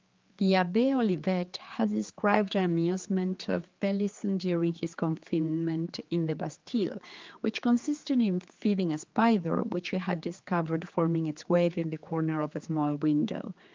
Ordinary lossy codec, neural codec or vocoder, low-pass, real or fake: Opus, 24 kbps; codec, 16 kHz, 4 kbps, X-Codec, HuBERT features, trained on general audio; 7.2 kHz; fake